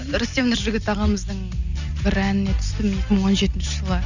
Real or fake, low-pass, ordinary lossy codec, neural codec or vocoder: real; 7.2 kHz; none; none